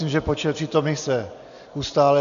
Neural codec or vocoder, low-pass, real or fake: none; 7.2 kHz; real